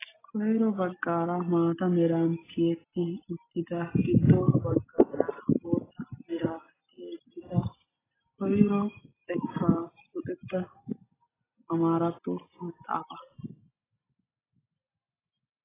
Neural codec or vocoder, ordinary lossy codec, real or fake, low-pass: none; AAC, 16 kbps; real; 3.6 kHz